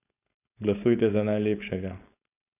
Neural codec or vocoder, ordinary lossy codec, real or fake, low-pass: codec, 16 kHz, 4.8 kbps, FACodec; none; fake; 3.6 kHz